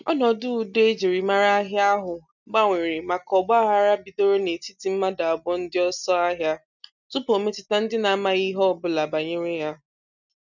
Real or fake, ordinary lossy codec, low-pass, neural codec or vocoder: real; none; 7.2 kHz; none